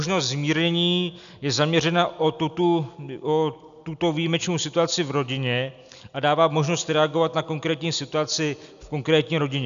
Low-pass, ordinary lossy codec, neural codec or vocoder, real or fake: 7.2 kHz; AAC, 96 kbps; none; real